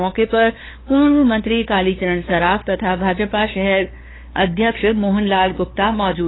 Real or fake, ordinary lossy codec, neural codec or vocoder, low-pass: fake; AAC, 16 kbps; codec, 16 kHz, 2 kbps, FunCodec, trained on LibriTTS, 25 frames a second; 7.2 kHz